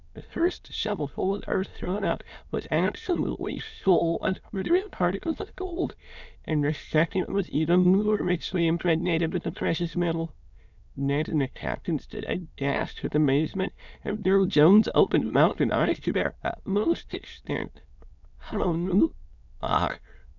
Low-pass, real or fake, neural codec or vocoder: 7.2 kHz; fake; autoencoder, 22.05 kHz, a latent of 192 numbers a frame, VITS, trained on many speakers